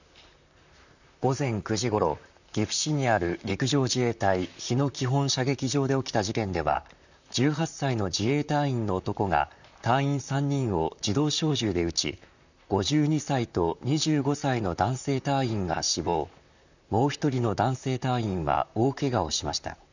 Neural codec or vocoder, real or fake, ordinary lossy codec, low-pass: vocoder, 44.1 kHz, 128 mel bands, Pupu-Vocoder; fake; none; 7.2 kHz